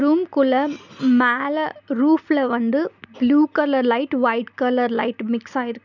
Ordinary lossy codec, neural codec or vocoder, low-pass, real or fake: none; none; 7.2 kHz; real